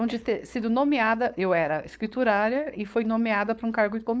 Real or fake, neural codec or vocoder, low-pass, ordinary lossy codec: fake; codec, 16 kHz, 4.8 kbps, FACodec; none; none